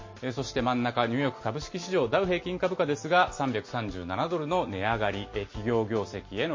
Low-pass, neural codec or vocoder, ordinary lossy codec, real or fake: 7.2 kHz; none; MP3, 32 kbps; real